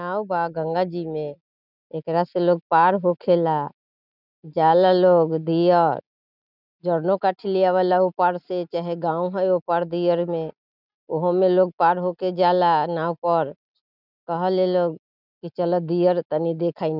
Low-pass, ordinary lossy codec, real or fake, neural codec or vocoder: 5.4 kHz; none; real; none